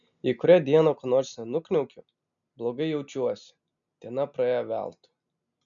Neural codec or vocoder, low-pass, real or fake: none; 7.2 kHz; real